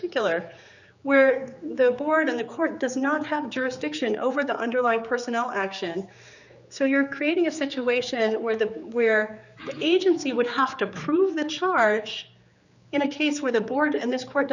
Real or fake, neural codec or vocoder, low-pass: fake; codec, 16 kHz, 4 kbps, X-Codec, HuBERT features, trained on general audio; 7.2 kHz